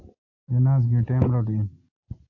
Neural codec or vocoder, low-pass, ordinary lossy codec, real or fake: none; 7.2 kHz; AAC, 48 kbps; real